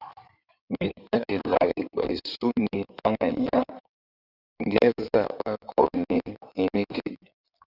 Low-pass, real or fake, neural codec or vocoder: 5.4 kHz; fake; codec, 16 kHz in and 24 kHz out, 2.2 kbps, FireRedTTS-2 codec